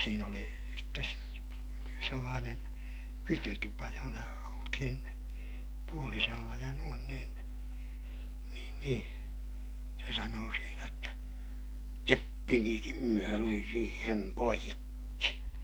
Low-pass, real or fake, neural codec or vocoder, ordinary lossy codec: none; fake; codec, 44.1 kHz, 2.6 kbps, SNAC; none